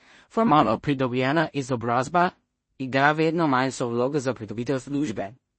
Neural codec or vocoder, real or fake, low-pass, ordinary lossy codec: codec, 16 kHz in and 24 kHz out, 0.4 kbps, LongCat-Audio-Codec, two codebook decoder; fake; 9.9 kHz; MP3, 32 kbps